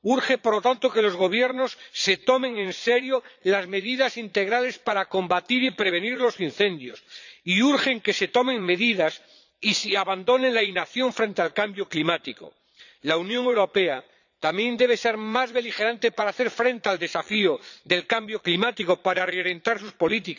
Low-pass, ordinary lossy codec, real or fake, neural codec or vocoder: 7.2 kHz; none; fake; vocoder, 22.05 kHz, 80 mel bands, Vocos